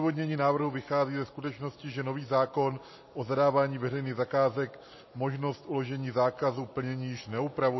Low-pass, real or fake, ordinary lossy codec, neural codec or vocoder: 7.2 kHz; real; MP3, 24 kbps; none